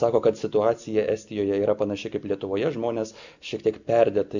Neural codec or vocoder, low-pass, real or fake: none; 7.2 kHz; real